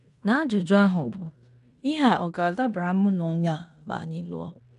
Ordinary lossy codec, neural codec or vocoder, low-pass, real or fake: none; codec, 16 kHz in and 24 kHz out, 0.9 kbps, LongCat-Audio-Codec, fine tuned four codebook decoder; 10.8 kHz; fake